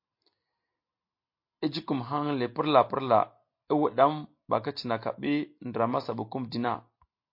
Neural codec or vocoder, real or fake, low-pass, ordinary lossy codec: none; real; 5.4 kHz; MP3, 32 kbps